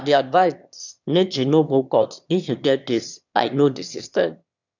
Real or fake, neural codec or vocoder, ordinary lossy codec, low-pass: fake; autoencoder, 22.05 kHz, a latent of 192 numbers a frame, VITS, trained on one speaker; none; 7.2 kHz